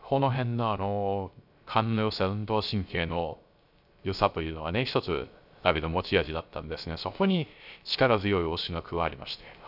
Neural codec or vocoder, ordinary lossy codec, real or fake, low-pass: codec, 16 kHz, 0.3 kbps, FocalCodec; none; fake; 5.4 kHz